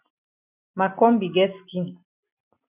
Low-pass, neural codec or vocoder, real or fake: 3.6 kHz; none; real